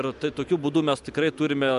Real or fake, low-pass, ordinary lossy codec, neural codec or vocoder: real; 10.8 kHz; MP3, 96 kbps; none